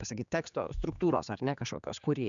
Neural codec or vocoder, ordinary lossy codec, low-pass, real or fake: codec, 16 kHz, 4 kbps, X-Codec, HuBERT features, trained on general audio; MP3, 96 kbps; 7.2 kHz; fake